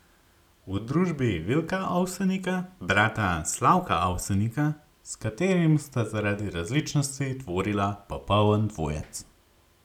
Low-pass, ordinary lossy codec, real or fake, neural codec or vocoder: 19.8 kHz; none; real; none